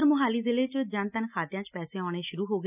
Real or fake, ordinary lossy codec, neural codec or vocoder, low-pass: real; none; none; 3.6 kHz